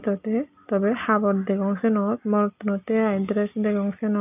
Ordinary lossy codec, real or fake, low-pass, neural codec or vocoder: none; real; 3.6 kHz; none